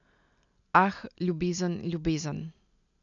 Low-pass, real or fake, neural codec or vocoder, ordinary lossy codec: 7.2 kHz; real; none; none